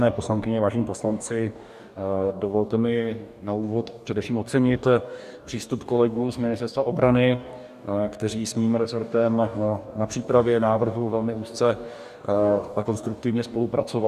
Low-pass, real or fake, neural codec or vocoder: 14.4 kHz; fake; codec, 44.1 kHz, 2.6 kbps, DAC